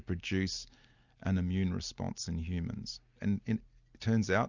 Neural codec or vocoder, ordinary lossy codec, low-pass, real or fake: none; Opus, 64 kbps; 7.2 kHz; real